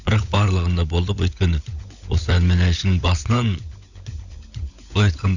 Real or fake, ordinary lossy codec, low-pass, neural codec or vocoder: fake; none; 7.2 kHz; codec, 16 kHz, 16 kbps, FunCodec, trained on LibriTTS, 50 frames a second